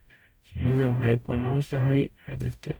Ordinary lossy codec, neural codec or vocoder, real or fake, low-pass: none; codec, 44.1 kHz, 0.9 kbps, DAC; fake; none